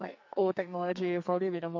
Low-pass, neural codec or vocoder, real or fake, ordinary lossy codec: 7.2 kHz; codec, 44.1 kHz, 2.6 kbps, SNAC; fake; MP3, 48 kbps